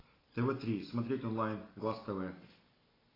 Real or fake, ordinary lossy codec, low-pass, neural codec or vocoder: real; AAC, 24 kbps; 5.4 kHz; none